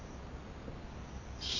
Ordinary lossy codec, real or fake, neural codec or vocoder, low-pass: none; real; none; 7.2 kHz